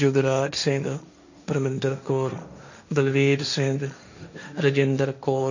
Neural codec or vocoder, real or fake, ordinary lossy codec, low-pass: codec, 16 kHz, 1.1 kbps, Voila-Tokenizer; fake; none; 7.2 kHz